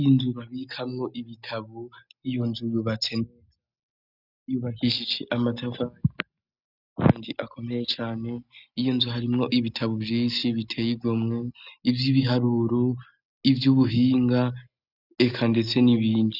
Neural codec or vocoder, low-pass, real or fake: none; 5.4 kHz; real